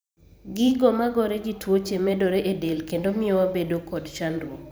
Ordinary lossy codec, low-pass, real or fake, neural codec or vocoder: none; none; fake; vocoder, 44.1 kHz, 128 mel bands every 512 samples, BigVGAN v2